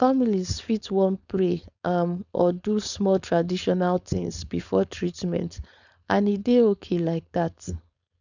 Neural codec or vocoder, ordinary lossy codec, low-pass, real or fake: codec, 16 kHz, 4.8 kbps, FACodec; none; 7.2 kHz; fake